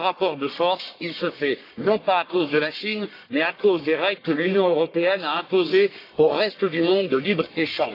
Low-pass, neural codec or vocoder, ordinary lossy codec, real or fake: 5.4 kHz; codec, 44.1 kHz, 1.7 kbps, Pupu-Codec; AAC, 32 kbps; fake